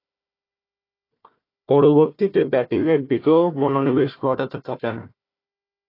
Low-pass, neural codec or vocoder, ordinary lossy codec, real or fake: 5.4 kHz; codec, 16 kHz, 1 kbps, FunCodec, trained on Chinese and English, 50 frames a second; AAC, 32 kbps; fake